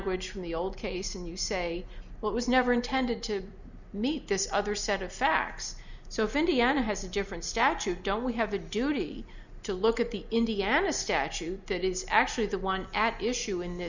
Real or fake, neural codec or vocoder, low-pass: real; none; 7.2 kHz